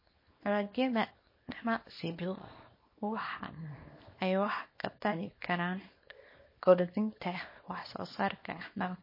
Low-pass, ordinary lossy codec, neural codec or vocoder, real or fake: 5.4 kHz; MP3, 24 kbps; codec, 24 kHz, 0.9 kbps, WavTokenizer, small release; fake